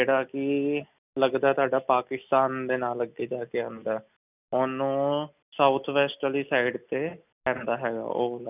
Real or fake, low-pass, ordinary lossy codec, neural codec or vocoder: real; 3.6 kHz; none; none